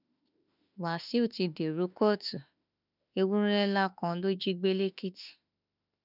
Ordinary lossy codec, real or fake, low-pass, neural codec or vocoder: none; fake; 5.4 kHz; autoencoder, 48 kHz, 32 numbers a frame, DAC-VAE, trained on Japanese speech